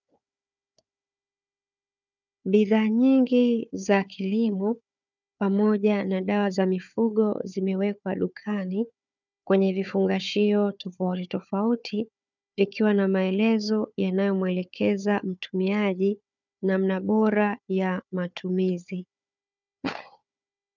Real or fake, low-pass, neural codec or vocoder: fake; 7.2 kHz; codec, 16 kHz, 4 kbps, FunCodec, trained on Chinese and English, 50 frames a second